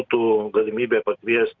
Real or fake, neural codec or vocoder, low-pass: real; none; 7.2 kHz